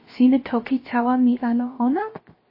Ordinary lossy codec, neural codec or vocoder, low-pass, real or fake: MP3, 24 kbps; codec, 16 kHz, 0.7 kbps, FocalCodec; 5.4 kHz; fake